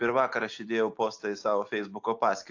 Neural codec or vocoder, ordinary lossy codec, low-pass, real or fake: none; AAC, 48 kbps; 7.2 kHz; real